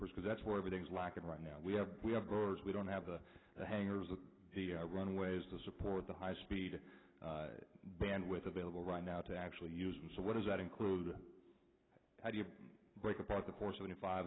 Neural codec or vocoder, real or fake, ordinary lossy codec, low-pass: none; real; AAC, 16 kbps; 7.2 kHz